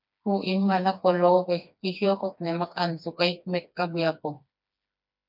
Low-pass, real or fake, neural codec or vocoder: 5.4 kHz; fake; codec, 16 kHz, 2 kbps, FreqCodec, smaller model